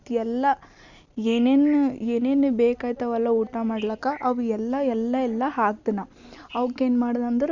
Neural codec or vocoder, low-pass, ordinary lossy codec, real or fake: none; 7.2 kHz; Opus, 64 kbps; real